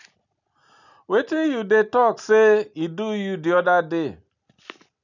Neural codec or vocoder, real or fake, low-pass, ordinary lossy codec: none; real; 7.2 kHz; none